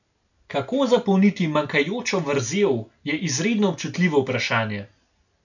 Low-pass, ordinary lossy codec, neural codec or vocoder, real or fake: 7.2 kHz; none; none; real